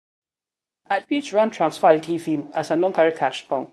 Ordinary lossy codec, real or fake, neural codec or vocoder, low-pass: none; fake; codec, 24 kHz, 0.9 kbps, WavTokenizer, medium speech release version 2; none